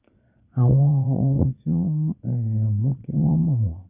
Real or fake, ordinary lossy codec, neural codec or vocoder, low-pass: fake; none; vocoder, 22.05 kHz, 80 mel bands, Vocos; 3.6 kHz